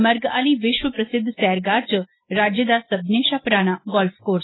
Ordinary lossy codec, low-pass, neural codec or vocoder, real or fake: AAC, 16 kbps; 7.2 kHz; none; real